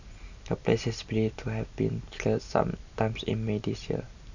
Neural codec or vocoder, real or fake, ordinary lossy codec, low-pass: none; real; none; 7.2 kHz